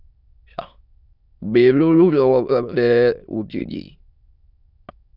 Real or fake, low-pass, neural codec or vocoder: fake; 5.4 kHz; autoencoder, 22.05 kHz, a latent of 192 numbers a frame, VITS, trained on many speakers